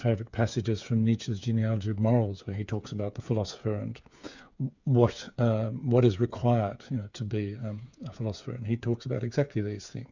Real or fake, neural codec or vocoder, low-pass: fake; codec, 16 kHz, 8 kbps, FreqCodec, smaller model; 7.2 kHz